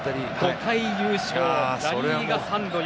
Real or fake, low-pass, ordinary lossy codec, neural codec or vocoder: real; none; none; none